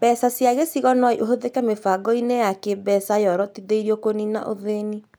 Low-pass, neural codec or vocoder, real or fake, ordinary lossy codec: none; none; real; none